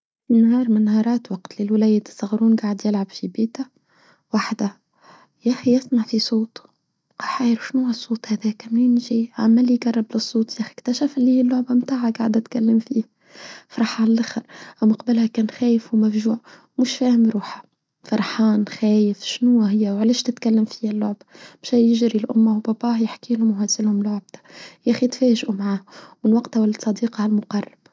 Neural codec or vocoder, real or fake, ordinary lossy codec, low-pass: none; real; none; none